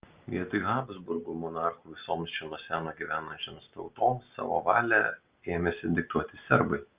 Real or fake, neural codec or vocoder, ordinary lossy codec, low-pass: real; none; Opus, 24 kbps; 3.6 kHz